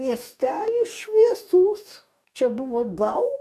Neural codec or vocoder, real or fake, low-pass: codec, 44.1 kHz, 2.6 kbps, DAC; fake; 14.4 kHz